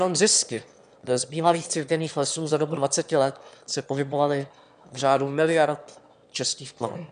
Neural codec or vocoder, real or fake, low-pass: autoencoder, 22.05 kHz, a latent of 192 numbers a frame, VITS, trained on one speaker; fake; 9.9 kHz